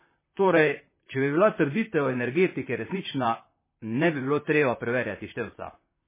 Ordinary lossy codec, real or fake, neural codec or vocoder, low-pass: MP3, 16 kbps; fake; vocoder, 44.1 kHz, 128 mel bands, Pupu-Vocoder; 3.6 kHz